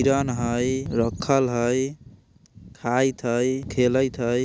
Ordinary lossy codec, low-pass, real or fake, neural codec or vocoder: none; none; real; none